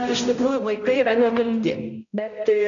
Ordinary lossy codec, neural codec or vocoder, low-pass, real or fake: MP3, 96 kbps; codec, 16 kHz, 0.5 kbps, X-Codec, HuBERT features, trained on balanced general audio; 7.2 kHz; fake